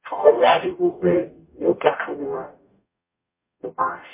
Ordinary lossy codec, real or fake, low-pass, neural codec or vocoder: MP3, 24 kbps; fake; 3.6 kHz; codec, 44.1 kHz, 0.9 kbps, DAC